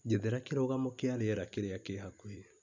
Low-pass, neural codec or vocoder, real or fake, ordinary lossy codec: 7.2 kHz; none; real; MP3, 64 kbps